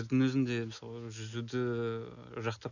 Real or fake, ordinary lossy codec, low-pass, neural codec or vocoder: real; none; 7.2 kHz; none